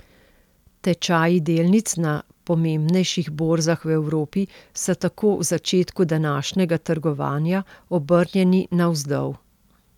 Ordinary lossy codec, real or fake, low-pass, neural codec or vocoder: none; real; 19.8 kHz; none